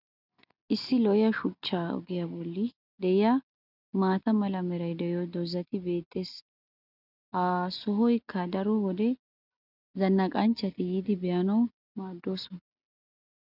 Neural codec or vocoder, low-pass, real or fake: none; 5.4 kHz; real